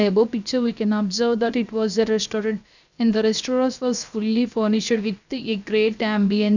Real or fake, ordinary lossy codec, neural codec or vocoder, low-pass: fake; none; codec, 16 kHz, 0.7 kbps, FocalCodec; 7.2 kHz